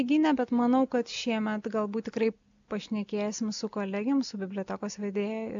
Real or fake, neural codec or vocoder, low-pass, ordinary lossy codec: real; none; 7.2 kHz; AAC, 64 kbps